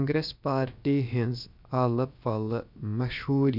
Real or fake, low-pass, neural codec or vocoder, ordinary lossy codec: fake; 5.4 kHz; codec, 16 kHz, about 1 kbps, DyCAST, with the encoder's durations; none